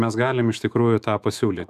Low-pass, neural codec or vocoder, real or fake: 14.4 kHz; autoencoder, 48 kHz, 128 numbers a frame, DAC-VAE, trained on Japanese speech; fake